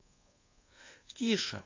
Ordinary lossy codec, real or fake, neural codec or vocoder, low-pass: AAC, 32 kbps; fake; codec, 24 kHz, 1.2 kbps, DualCodec; 7.2 kHz